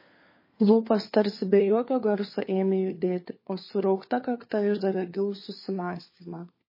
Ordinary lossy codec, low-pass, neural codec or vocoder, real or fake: MP3, 24 kbps; 5.4 kHz; codec, 16 kHz, 4 kbps, FunCodec, trained on LibriTTS, 50 frames a second; fake